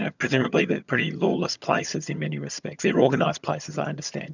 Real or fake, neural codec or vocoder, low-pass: fake; vocoder, 22.05 kHz, 80 mel bands, HiFi-GAN; 7.2 kHz